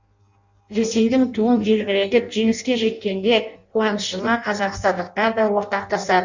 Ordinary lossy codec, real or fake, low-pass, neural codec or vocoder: Opus, 64 kbps; fake; 7.2 kHz; codec, 16 kHz in and 24 kHz out, 0.6 kbps, FireRedTTS-2 codec